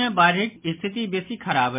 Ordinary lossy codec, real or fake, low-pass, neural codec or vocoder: MP3, 32 kbps; real; 3.6 kHz; none